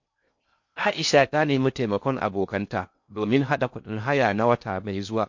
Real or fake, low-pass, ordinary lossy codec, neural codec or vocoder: fake; 7.2 kHz; MP3, 48 kbps; codec, 16 kHz in and 24 kHz out, 0.6 kbps, FocalCodec, streaming, 4096 codes